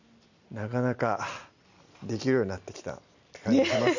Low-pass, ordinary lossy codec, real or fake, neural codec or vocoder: 7.2 kHz; none; real; none